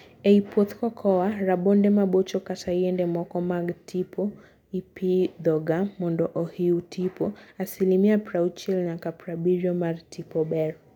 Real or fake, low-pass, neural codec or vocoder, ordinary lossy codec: real; 19.8 kHz; none; none